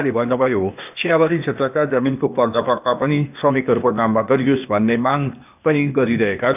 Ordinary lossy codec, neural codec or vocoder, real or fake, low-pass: none; codec, 16 kHz, 0.8 kbps, ZipCodec; fake; 3.6 kHz